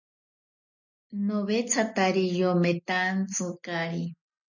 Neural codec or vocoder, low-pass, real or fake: none; 7.2 kHz; real